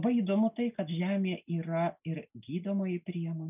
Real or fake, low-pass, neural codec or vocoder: real; 3.6 kHz; none